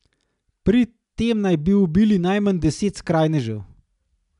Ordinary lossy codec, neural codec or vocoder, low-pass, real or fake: none; none; 10.8 kHz; real